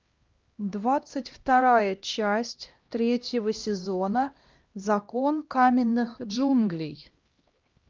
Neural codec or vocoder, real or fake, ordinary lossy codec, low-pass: codec, 16 kHz, 1 kbps, X-Codec, HuBERT features, trained on LibriSpeech; fake; Opus, 24 kbps; 7.2 kHz